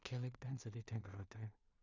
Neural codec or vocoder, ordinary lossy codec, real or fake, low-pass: codec, 16 kHz in and 24 kHz out, 0.4 kbps, LongCat-Audio-Codec, two codebook decoder; none; fake; 7.2 kHz